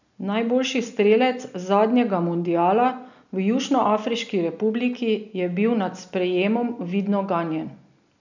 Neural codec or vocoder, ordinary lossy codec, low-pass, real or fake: none; none; 7.2 kHz; real